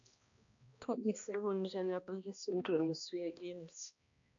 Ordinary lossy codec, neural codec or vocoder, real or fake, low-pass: AAC, 64 kbps; codec, 16 kHz, 1 kbps, X-Codec, HuBERT features, trained on balanced general audio; fake; 7.2 kHz